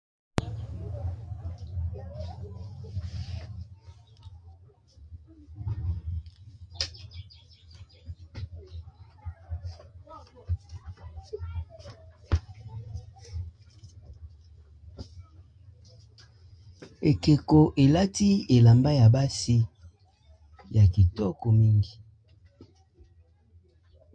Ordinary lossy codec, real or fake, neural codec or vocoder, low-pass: MP3, 48 kbps; real; none; 9.9 kHz